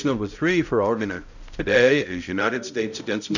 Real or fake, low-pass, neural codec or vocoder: fake; 7.2 kHz; codec, 16 kHz, 0.5 kbps, X-Codec, HuBERT features, trained on balanced general audio